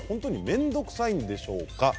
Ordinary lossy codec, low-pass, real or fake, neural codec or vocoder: none; none; real; none